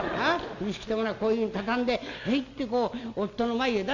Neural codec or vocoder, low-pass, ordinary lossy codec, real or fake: none; 7.2 kHz; none; real